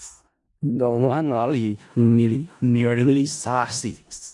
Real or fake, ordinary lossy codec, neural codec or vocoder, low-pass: fake; AAC, 64 kbps; codec, 16 kHz in and 24 kHz out, 0.4 kbps, LongCat-Audio-Codec, four codebook decoder; 10.8 kHz